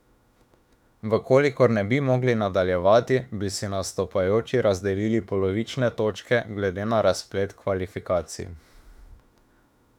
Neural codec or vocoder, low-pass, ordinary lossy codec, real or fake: autoencoder, 48 kHz, 32 numbers a frame, DAC-VAE, trained on Japanese speech; 19.8 kHz; none; fake